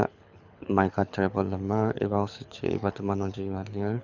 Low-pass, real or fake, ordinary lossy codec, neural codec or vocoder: 7.2 kHz; fake; none; codec, 24 kHz, 6 kbps, HILCodec